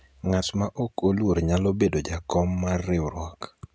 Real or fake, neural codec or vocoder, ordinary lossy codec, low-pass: real; none; none; none